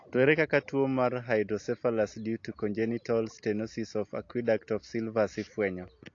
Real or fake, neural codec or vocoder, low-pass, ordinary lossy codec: real; none; 7.2 kHz; AAC, 48 kbps